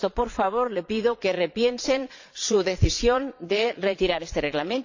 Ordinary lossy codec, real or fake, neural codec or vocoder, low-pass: AAC, 48 kbps; fake; vocoder, 22.05 kHz, 80 mel bands, Vocos; 7.2 kHz